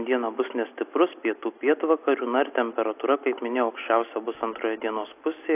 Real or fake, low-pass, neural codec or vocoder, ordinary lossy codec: real; 3.6 kHz; none; AAC, 32 kbps